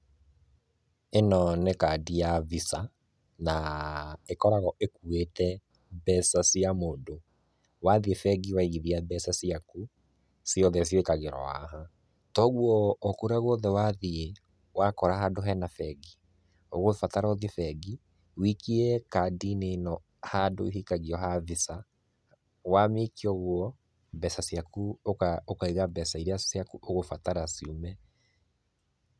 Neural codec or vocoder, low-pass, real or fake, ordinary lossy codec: none; none; real; none